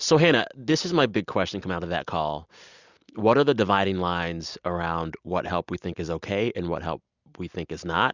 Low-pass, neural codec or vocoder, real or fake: 7.2 kHz; none; real